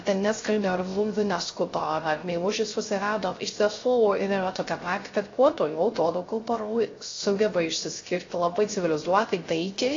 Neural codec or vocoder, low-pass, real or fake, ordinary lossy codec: codec, 16 kHz, 0.3 kbps, FocalCodec; 7.2 kHz; fake; AAC, 32 kbps